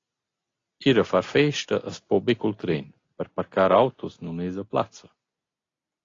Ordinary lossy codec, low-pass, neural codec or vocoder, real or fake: AAC, 32 kbps; 7.2 kHz; none; real